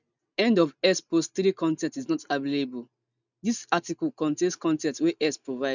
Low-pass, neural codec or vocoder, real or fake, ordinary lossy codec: 7.2 kHz; none; real; none